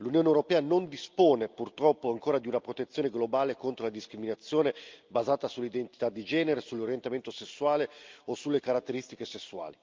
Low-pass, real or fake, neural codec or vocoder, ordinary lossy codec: 7.2 kHz; real; none; Opus, 24 kbps